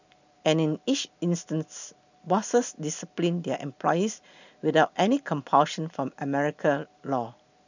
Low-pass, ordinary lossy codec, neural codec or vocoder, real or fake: 7.2 kHz; none; none; real